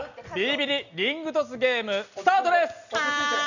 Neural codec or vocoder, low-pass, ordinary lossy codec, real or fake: none; 7.2 kHz; none; real